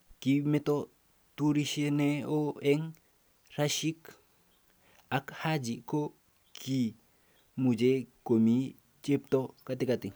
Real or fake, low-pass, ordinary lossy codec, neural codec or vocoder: real; none; none; none